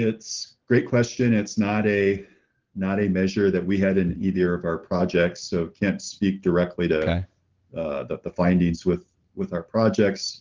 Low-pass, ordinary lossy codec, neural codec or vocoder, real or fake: 7.2 kHz; Opus, 16 kbps; none; real